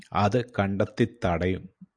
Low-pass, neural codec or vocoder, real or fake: 9.9 kHz; none; real